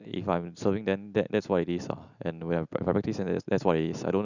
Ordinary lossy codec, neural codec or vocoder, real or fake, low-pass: none; none; real; 7.2 kHz